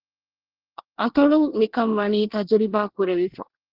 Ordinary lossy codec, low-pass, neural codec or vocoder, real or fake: Opus, 16 kbps; 5.4 kHz; codec, 24 kHz, 1 kbps, SNAC; fake